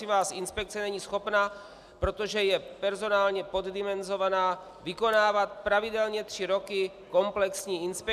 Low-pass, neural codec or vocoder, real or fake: 14.4 kHz; none; real